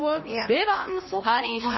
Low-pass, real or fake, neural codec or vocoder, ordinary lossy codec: 7.2 kHz; fake; codec, 16 kHz, 1 kbps, X-Codec, HuBERT features, trained on LibriSpeech; MP3, 24 kbps